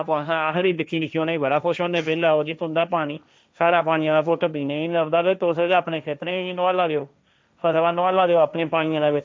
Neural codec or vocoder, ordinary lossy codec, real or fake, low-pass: codec, 16 kHz, 1.1 kbps, Voila-Tokenizer; none; fake; none